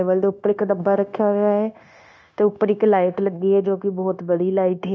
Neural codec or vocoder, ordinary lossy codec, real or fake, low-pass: codec, 16 kHz, 0.9 kbps, LongCat-Audio-Codec; none; fake; none